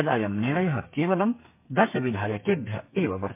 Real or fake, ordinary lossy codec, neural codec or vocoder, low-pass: fake; MP3, 24 kbps; codec, 32 kHz, 1.9 kbps, SNAC; 3.6 kHz